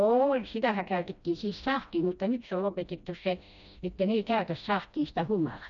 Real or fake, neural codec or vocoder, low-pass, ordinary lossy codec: fake; codec, 16 kHz, 1 kbps, FreqCodec, smaller model; 7.2 kHz; none